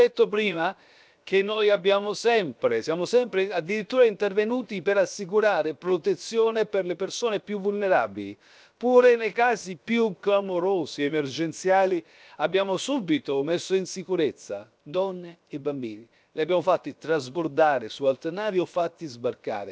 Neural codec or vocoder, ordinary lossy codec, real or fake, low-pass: codec, 16 kHz, 0.7 kbps, FocalCodec; none; fake; none